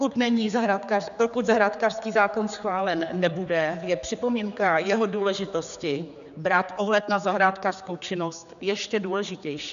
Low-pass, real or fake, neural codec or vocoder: 7.2 kHz; fake; codec, 16 kHz, 4 kbps, X-Codec, HuBERT features, trained on general audio